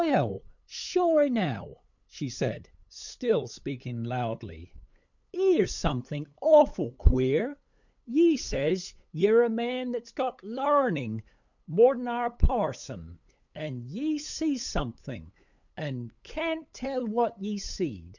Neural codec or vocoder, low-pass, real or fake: codec, 16 kHz, 8 kbps, FunCodec, trained on LibriTTS, 25 frames a second; 7.2 kHz; fake